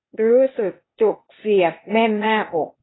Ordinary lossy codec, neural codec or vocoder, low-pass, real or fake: AAC, 16 kbps; codec, 16 kHz, 0.8 kbps, ZipCodec; 7.2 kHz; fake